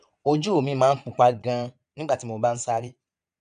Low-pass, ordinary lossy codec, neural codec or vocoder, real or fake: 9.9 kHz; none; vocoder, 22.05 kHz, 80 mel bands, Vocos; fake